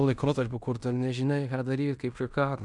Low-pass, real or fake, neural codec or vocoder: 10.8 kHz; fake; codec, 16 kHz in and 24 kHz out, 0.9 kbps, LongCat-Audio-Codec, fine tuned four codebook decoder